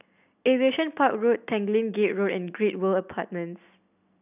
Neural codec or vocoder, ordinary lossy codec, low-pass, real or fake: none; none; 3.6 kHz; real